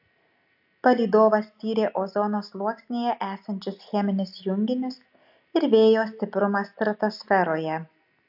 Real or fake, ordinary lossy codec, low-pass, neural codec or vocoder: real; AAC, 48 kbps; 5.4 kHz; none